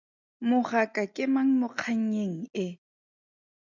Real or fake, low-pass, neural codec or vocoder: real; 7.2 kHz; none